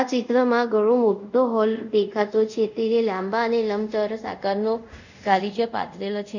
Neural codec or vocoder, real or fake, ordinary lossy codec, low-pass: codec, 24 kHz, 0.5 kbps, DualCodec; fake; none; 7.2 kHz